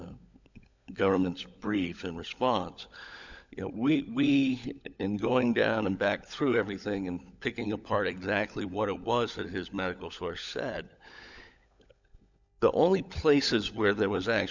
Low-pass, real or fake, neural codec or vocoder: 7.2 kHz; fake; codec, 16 kHz, 16 kbps, FunCodec, trained on LibriTTS, 50 frames a second